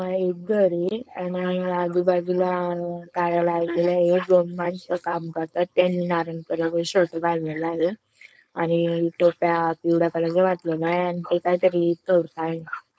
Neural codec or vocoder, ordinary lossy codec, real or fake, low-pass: codec, 16 kHz, 4.8 kbps, FACodec; none; fake; none